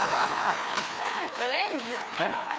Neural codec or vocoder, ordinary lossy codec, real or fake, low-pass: codec, 16 kHz, 2 kbps, FreqCodec, larger model; none; fake; none